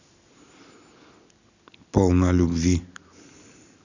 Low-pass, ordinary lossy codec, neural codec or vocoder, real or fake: 7.2 kHz; none; none; real